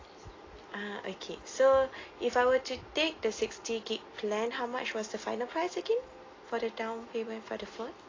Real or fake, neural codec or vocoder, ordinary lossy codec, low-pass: real; none; AAC, 32 kbps; 7.2 kHz